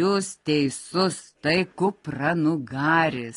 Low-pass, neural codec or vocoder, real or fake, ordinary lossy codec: 10.8 kHz; none; real; AAC, 32 kbps